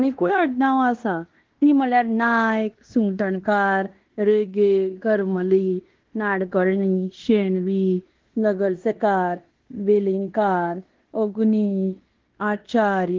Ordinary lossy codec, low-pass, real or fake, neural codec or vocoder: Opus, 16 kbps; 7.2 kHz; fake; codec, 16 kHz in and 24 kHz out, 0.9 kbps, LongCat-Audio-Codec, fine tuned four codebook decoder